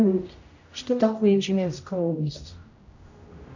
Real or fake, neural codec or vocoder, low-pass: fake; codec, 16 kHz, 0.5 kbps, X-Codec, HuBERT features, trained on general audio; 7.2 kHz